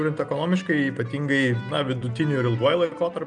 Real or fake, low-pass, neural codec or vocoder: real; 9.9 kHz; none